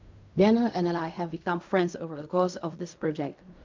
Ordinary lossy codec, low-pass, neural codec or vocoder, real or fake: MP3, 64 kbps; 7.2 kHz; codec, 16 kHz in and 24 kHz out, 0.4 kbps, LongCat-Audio-Codec, fine tuned four codebook decoder; fake